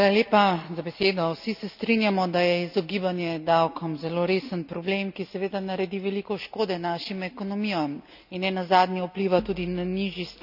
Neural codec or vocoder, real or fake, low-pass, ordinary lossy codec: none; real; 5.4 kHz; none